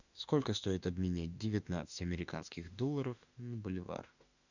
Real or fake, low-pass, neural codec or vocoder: fake; 7.2 kHz; autoencoder, 48 kHz, 32 numbers a frame, DAC-VAE, trained on Japanese speech